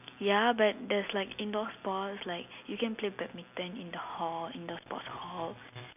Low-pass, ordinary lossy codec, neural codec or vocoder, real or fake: 3.6 kHz; none; none; real